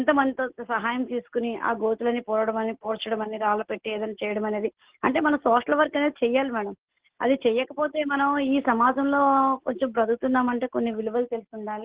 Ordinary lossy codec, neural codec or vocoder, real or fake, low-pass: Opus, 16 kbps; none; real; 3.6 kHz